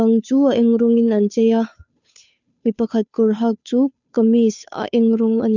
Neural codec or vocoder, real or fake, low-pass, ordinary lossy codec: codec, 16 kHz, 2 kbps, FunCodec, trained on Chinese and English, 25 frames a second; fake; 7.2 kHz; none